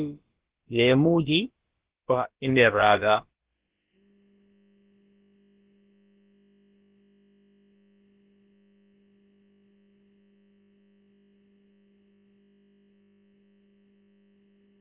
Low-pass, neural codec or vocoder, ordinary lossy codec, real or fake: 3.6 kHz; codec, 16 kHz, about 1 kbps, DyCAST, with the encoder's durations; Opus, 16 kbps; fake